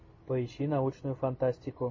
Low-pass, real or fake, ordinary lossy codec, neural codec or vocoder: 7.2 kHz; real; MP3, 32 kbps; none